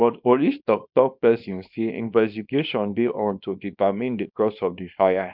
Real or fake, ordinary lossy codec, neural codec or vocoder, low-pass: fake; none; codec, 24 kHz, 0.9 kbps, WavTokenizer, small release; 5.4 kHz